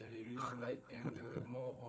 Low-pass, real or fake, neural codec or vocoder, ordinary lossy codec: none; fake; codec, 16 kHz, 4 kbps, FunCodec, trained on Chinese and English, 50 frames a second; none